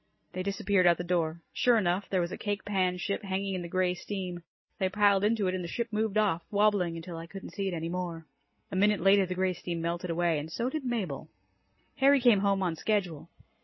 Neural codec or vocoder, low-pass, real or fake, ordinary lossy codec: none; 7.2 kHz; real; MP3, 24 kbps